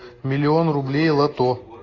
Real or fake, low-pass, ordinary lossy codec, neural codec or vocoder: real; 7.2 kHz; AAC, 32 kbps; none